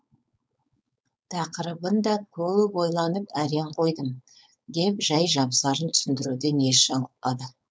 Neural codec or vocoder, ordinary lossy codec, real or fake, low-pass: codec, 16 kHz, 4.8 kbps, FACodec; none; fake; none